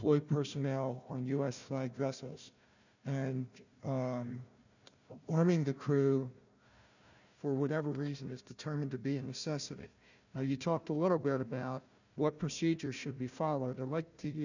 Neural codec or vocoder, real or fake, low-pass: codec, 16 kHz, 1 kbps, FunCodec, trained on Chinese and English, 50 frames a second; fake; 7.2 kHz